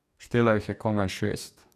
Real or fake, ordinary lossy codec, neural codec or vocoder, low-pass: fake; none; codec, 44.1 kHz, 2.6 kbps, DAC; 14.4 kHz